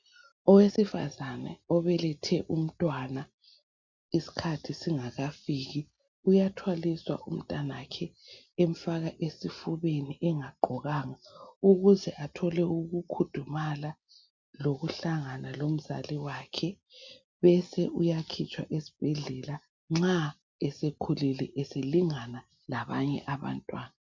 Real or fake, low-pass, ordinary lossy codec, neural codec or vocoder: real; 7.2 kHz; MP3, 64 kbps; none